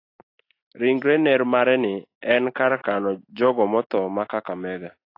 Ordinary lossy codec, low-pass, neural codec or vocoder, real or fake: AAC, 32 kbps; 5.4 kHz; none; real